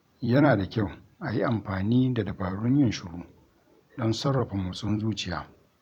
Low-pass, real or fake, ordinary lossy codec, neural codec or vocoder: 19.8 kHz; fake; none; vocoder, 44.1 kHz, 128 mel bands every 256 samples, BigVGAN v2